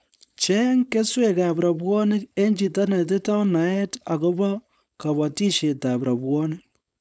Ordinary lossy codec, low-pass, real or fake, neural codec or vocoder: none; none; fake; codec, 16 kHz, 4.8 kbps, FACodec